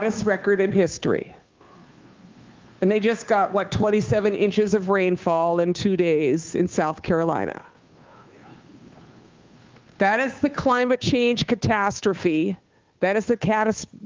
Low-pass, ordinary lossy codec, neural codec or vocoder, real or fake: 7.2 kHz; Opus, 32 kbps; codec, 16 kHz, 2 kbps, FunCodec, trained on Chinese and English, 25 frames a second; fake